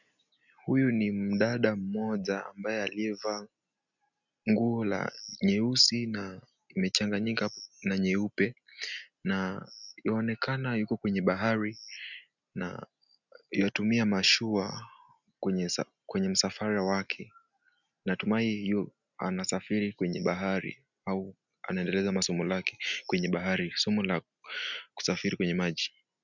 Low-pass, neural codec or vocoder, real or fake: 7.2 kHz; none; real